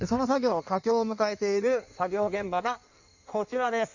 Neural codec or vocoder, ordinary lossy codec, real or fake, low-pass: codec, 16 kHz in and 24 kHz out, 1.1 kbps, FireRedTTS-2 codec; none; fake; 7.2 kHz